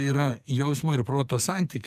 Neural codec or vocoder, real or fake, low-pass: codec, 44.1 kHz, 2.6 kbps, SNAC; fake; 14.4 kHz